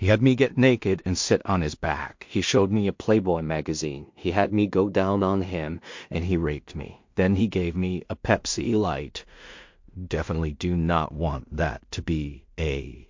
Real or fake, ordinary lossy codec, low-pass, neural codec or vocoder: fake; MP3, 48 kbps; 7.2 kHz; codec, 16 kHz in and 24 kHz out, 0.4 kbps, LongCat-Audio-Codec, two codebook decoder